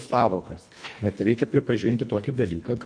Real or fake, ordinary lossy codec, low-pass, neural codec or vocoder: fake; MP3, 64 kbps; 9.9 kHz; codec, 24 kHz, 1.5 kbps, HILCodec